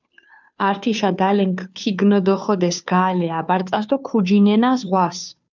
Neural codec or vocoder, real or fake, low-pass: codec, 16 kHz, 2 kbps, FunCodec, trained on Chinese and English, 25 frames a second; fake; 7.2 kHz